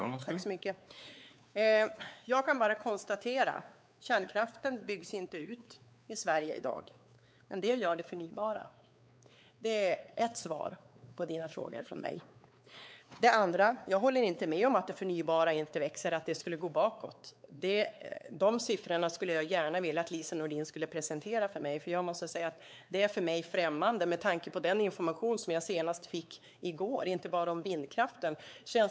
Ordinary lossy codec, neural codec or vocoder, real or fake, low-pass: none; codec, 16 kHz, 4 kbps, X-Codec, WavLM features, trained on Multilingual LibriSpeech; fake; none